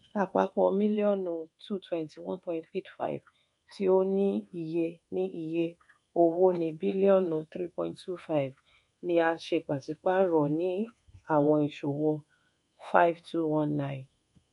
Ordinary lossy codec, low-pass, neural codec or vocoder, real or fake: MP3, 64 kbps; 10.8 kHz; codec, 24 kHz, 1.2 kbps, DualCodec; fake